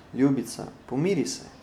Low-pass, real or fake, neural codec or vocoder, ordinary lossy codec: 19.8 kHz; real; none; none